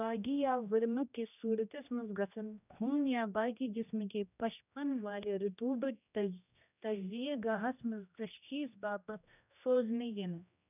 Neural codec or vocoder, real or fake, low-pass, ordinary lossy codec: codec, 16 kHz, 1 kbps, X-Codec, HuBERT features, trained on general audio; fake; 3.6 kHz; none